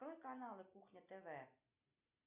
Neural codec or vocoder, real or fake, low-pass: none; real; 3.6 kHz